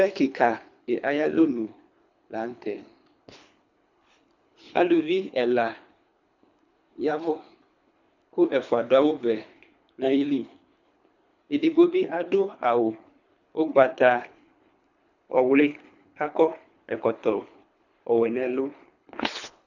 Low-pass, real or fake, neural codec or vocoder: 7.2 kHz; fake; codec, 24 kHz, 3 kbps, HILCodec